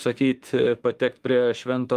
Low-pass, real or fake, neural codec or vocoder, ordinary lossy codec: 14.4 kHz; fake; autoencoder, 48 kHz, 32 numbers a frame, DAC-VAE, trained on Japanese speech; Opus, 32 kbps